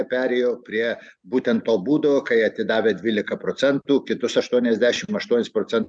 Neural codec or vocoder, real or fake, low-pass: none; real; 9.9 kHz